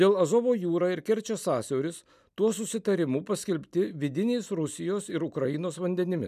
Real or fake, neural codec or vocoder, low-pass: real; none; 14.4 kHz